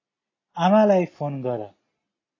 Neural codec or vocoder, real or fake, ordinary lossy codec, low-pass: none; real; AAC, 32 kbps; 7.2 kHz